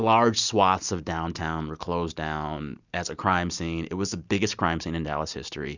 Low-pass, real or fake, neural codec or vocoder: 7.2 kHz; real; none